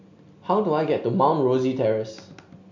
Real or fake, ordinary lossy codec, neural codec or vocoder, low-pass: real; MP3, 48 kbps; none; 7.2 kHz